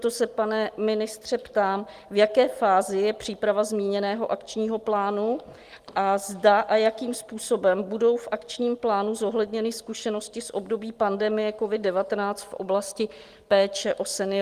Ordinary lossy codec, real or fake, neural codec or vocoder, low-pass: Opus, 24 kbps; real; none; 14.4 kHz